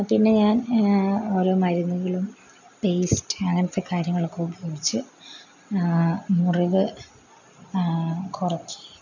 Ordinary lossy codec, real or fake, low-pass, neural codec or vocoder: none; real; 7.2 kHz; none